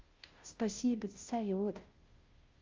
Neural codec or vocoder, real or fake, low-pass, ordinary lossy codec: codec, 16 kHz, 0.5 kbps, FunCodec, trained on Chinese and English, 25 frames a second; fake; 7.2 kHz; Opus, 32 kbps